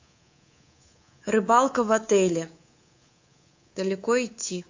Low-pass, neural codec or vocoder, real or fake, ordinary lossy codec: 7.2 kHz; codec, 24 kHz, 3.1 kbps, DualCodec; fake; AAC, 48 kbps